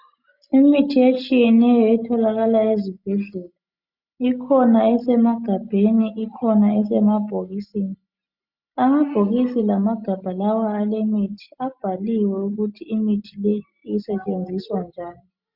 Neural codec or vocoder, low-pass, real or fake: none; 5.4 kHz; real